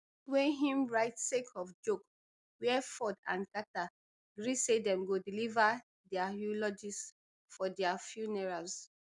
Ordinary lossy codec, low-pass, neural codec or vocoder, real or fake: MP3, 96 kbps; 10.8 kHz; none; real